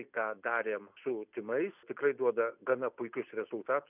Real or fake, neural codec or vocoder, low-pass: real; none; 3.6 kHz